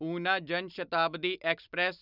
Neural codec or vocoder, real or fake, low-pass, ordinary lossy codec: none; real; 5.4 kHz; none